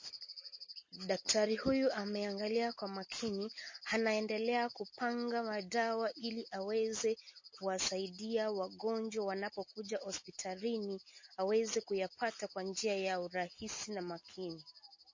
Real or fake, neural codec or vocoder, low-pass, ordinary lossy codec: real; none; 7.2 kHz; MP3, 32 kbps